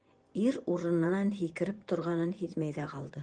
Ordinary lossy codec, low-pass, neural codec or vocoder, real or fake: Opus, 24 kbps; 9.9 kHz; vocoder, 24 kHz, 100 mel bands, Vocos; fake